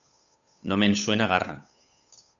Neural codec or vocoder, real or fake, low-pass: codec, 16 kHz, 8 kbps, FunCodec, trained on Chinese and English, 25 frames a second; fake; 7.2 kHz